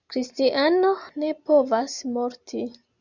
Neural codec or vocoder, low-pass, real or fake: none; 7.2 kHz; real